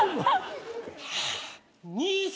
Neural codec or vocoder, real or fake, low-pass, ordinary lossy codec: none; real; none; none